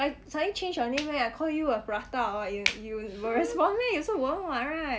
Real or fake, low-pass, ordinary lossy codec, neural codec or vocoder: real; none; none; none